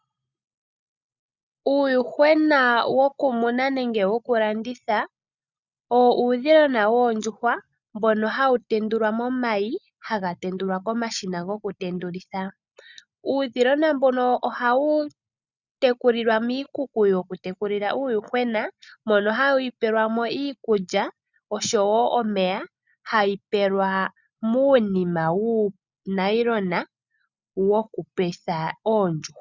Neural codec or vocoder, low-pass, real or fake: none; 7.2 kHz; real